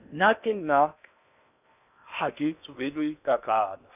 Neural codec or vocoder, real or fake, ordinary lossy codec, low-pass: codec, 16 kHz in and 24 kHz out, 0.8 kbps, FocalCodec, streaming, 65536 codes; fake; none; 3.6 kHz